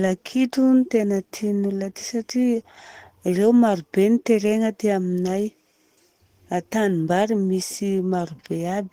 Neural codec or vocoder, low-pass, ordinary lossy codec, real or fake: none; 19.8 kHz; Opus, 16 kbps; real